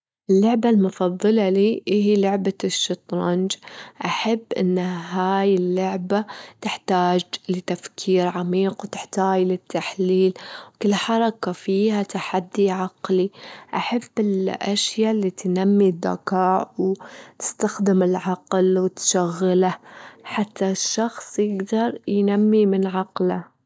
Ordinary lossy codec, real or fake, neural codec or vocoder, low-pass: none; real; none; none